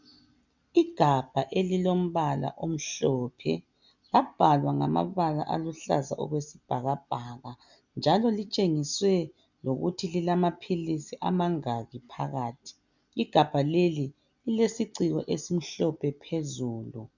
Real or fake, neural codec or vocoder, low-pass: real; none; 7.2 kHz